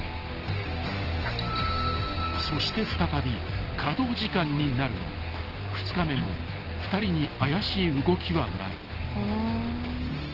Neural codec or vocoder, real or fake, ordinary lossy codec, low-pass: none; real; Opus, 16 kbps; 5.4 kHz